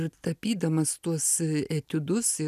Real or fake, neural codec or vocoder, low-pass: real; none; 14.4 kHz